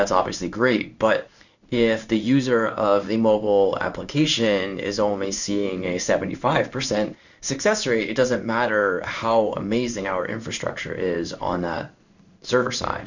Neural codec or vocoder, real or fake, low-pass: codec, 16 kHz in and 24 kHz out, 1 kbps, XY-Tokenizer; fake; 7.2 kHz